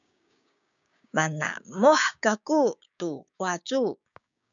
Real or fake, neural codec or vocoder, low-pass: fake; codec, 16 kHz, 6 kbps, DAC; 7.2 kHz